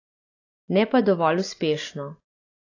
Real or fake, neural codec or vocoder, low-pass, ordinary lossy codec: real; none; 7.2 kHz; AAC, 32 kbps